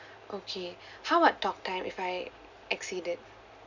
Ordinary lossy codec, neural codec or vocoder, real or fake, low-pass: none; none; real; 7.2 kHz